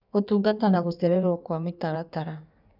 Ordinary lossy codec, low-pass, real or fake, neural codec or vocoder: none; 5.4 kHz; fake; codec, 16 kHz in and 24 kHz out, 1.1 kbps, FireRedTTS-2 codec